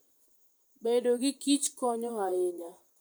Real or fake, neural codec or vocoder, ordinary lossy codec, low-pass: fake; vocoder, 44.1 kHz, 128 mel bands, Pupu-Vocoder; none; none